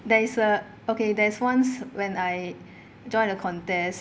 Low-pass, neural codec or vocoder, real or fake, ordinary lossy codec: none; none; real; none